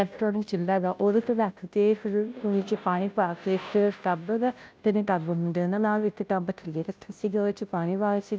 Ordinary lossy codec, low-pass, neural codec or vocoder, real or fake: none; none; codec, 16 kHz, 0.5 kbps, FunCodec, trained on Chinese and English, 25 frames a second; fake